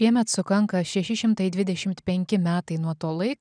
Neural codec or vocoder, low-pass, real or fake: none; 9.9 kHz; real